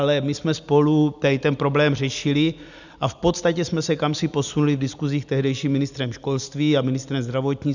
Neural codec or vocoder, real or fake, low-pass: none; real; 7.2 kHz